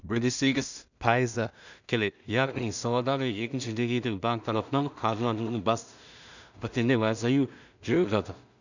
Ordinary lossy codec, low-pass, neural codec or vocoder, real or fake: none; 7.2 kHz; codec, 16 kHz in and 24 kHz out, 0.4 kbps, LongCat-Audio-Codec, two codebook decoder; fake